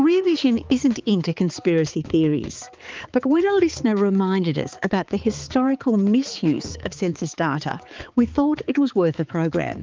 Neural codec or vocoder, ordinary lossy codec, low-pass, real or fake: codec, 16 kHz, 4 kbps, X-Codec, HuBERT features, trained on balanced general audio; Opus, 24 kbps; 7.2 kHz; fake